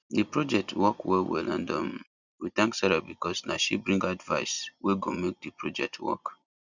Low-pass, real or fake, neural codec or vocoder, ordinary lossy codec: 7.2 kHz; real; none; none